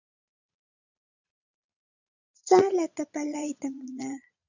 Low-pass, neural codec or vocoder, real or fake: 7.2 kHz; vocoder, 22.05 kHz, 80 mel bands, Vocos; fake